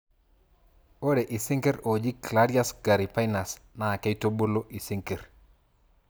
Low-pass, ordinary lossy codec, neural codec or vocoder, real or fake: none; none; none; real